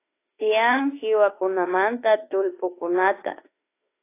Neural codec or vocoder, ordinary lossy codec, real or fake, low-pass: autoencoder, 48 kHz, 32 numbers a frame, DAC-VAE, trained on Japanese speech; AAC, 24 kbps; fake; 3.6 kHz